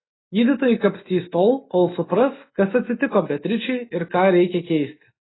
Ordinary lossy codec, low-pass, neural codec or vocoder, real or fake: AAC, 16 kbps; 7.2 kHz; none; real